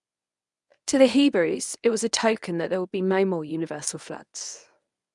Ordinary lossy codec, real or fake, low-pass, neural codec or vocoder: none; fake; 10.8 kHz; codec, 24 kHz, 0.9 kbps, WavTokenizer, medium speech release version 1